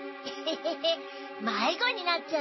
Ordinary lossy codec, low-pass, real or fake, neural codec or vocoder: MP3, 24 kbps; 7.2 kHz; real; none